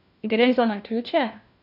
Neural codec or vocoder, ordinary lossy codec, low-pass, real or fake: codec, 16 kHz, 1 kbps, FunCodec, trained on LibriTTS, 50 frames a second; none; 5.4 kHz; fake